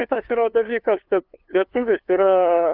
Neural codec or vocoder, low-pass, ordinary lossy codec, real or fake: codec, 16 kHz, 2 kbps, FunCodec, trained on LibriTTS, 25 frames a second; 5.4 kHz; Opus, 24 kbps; fake